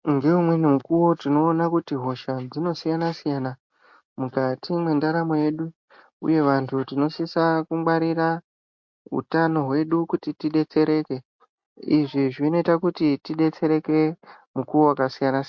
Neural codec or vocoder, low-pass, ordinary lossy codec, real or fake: none; 7.2 kHz; MP3, 48 kbps; real